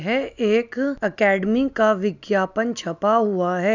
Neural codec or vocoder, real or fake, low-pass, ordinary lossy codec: none; real; 7.2 kHz; none